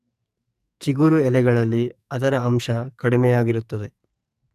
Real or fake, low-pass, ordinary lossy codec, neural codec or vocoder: fake; 14.4 kHz; none; codec, 44.1 kHz, 2.6 kbps, SNAC